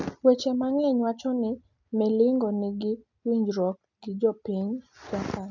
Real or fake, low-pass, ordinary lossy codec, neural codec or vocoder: real; 7.2 kHz; none; none